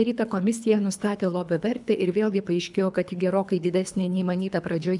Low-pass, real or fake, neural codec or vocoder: 10.8 kHz; fake; codec, 24 kHz, 3 kbps, HILCodec